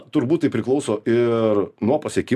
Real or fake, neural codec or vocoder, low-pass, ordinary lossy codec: real; none; 14.4 kHz; AAC, 96 kbps